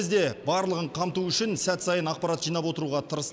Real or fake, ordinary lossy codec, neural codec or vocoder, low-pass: real; none; none; none